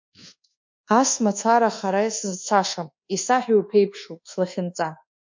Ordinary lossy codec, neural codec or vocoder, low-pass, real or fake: MP3, 48 kbps; codec, 24 kHz, 1.2 kbps, DualCodec; 7.2 kHz; fake